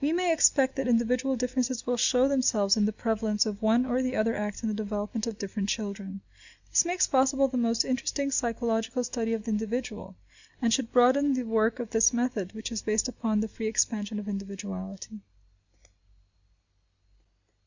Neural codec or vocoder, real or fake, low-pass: none; real; 7.2 kHz